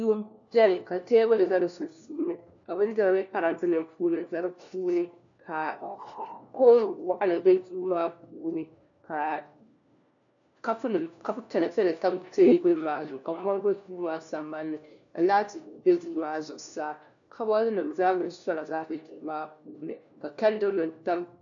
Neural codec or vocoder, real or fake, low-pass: codec, 16 kHz, 1 kbps, FunCodec, trained on LibriTTS, 50 frames a second; fake; 7.2 kHz